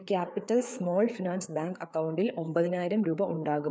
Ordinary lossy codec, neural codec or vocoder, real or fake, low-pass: none; codec, 16 kHz, 4 kbps, FreqCodec, larger model; fake; none